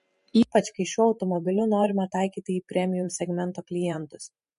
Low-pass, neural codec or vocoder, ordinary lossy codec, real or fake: 14.4 kHz; vocoder, 44.1 kHz, 128 mel bands every 512 samples, BigVGAN v2; MP3, 48 kbps; fake